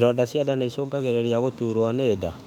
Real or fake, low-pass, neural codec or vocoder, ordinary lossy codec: fake; 19.8 kHz; autoencoder, 48 kHz, 32 numbers a frame, DAC-VAE, trained on Japanese speech; none